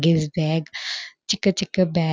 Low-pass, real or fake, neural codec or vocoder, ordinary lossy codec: none; real; none; none